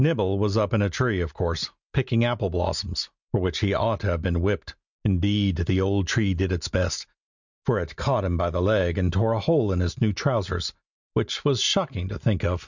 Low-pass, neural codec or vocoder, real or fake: 7.2 kHz; none; real